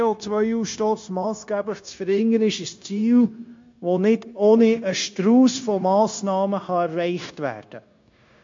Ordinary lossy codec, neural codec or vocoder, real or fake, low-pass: MP3, 48 kbps; codec, 16 kHz, 0.9 kbps, LongCat-Audio-Codec; fake; 7.2 kHz